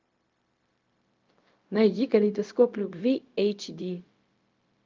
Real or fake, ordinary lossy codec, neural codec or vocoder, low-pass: fake; Opus, 24 kbps; codec, 16 kHz, 0.4 kbps, LongCat-Audio-Codec; 7.2 kHz